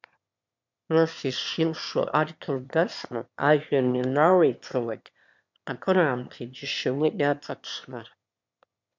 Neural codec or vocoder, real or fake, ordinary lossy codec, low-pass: autoencoder, 22.05 kHz, a latent of 192 numbers a frame, VITS, trained on one speaker; fake; MP3, 64 kbps; 7.2 kHz